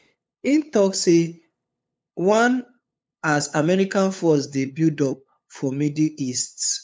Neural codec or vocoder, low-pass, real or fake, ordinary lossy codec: codec, 16 kHz, 8 kbps, FunCodec, trained on LibriTTS, 25 frames a second; none; fake; none